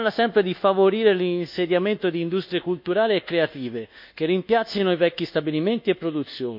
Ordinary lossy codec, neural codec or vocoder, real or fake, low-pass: MP3, 48 kbps; codec, 24 kHz, 1.2 kbps, DualCodec; fake; 5.4 kHz